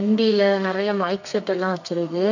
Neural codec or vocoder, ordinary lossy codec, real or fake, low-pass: codec, 32 kHz, 1.9 kbps, SNAC; none; fake; 7.2 kHz